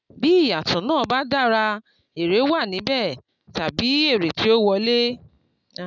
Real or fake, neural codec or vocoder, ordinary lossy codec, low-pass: real; none; none; 7.2 kHz